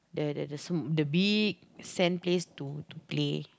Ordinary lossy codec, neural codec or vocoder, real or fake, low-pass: none; none; real; none